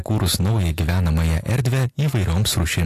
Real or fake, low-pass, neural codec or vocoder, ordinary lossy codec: real; 14.4 kHz; none; AAC, 64 kbps